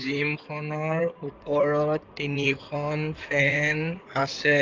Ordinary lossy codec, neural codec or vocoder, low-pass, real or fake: Opus, 24 kbps; codec, 16 kHz in and 24 kHz out, 2.2 kbps, FireRedTTS-2 codec; 7.2 kHz; fake